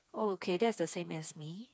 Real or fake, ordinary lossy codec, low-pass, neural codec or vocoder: fake; none; none; codec, 16 kHz, 4 kbps, FreqCodec, smaller model